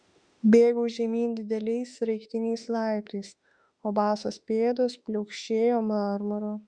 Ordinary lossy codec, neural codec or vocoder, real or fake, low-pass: Opus, 64 kbps; autoencoder, 48 kHz, 32 numbers a frame, DAC-VAE, trained on Japanese speech; fake; 9.9 kHz